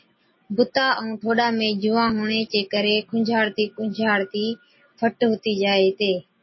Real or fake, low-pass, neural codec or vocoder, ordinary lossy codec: real; 7.2 kHz; none; MP3, 24 kbps